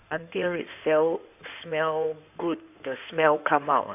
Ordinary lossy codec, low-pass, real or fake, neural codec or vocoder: none; 3.6 kHz; fake; codec, 16 kHz in and 24 kHz out, 2.2 kbps, FireRedTTS-2 codec